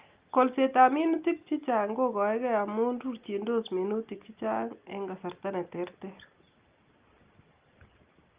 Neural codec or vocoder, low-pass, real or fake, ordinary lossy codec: none; 3.6 kHz; real; Opus, 24 kbps